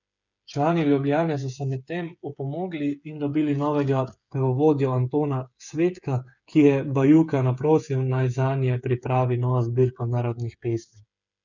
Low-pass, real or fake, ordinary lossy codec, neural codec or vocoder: 7.2 kHz; fake; none; codec, 16 kHz, 8 kbps, FreqCodec, smaller model